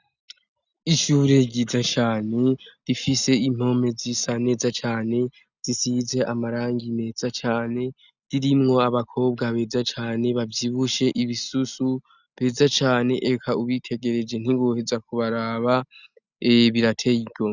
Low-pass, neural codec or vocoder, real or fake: 7.2 kHz; none; real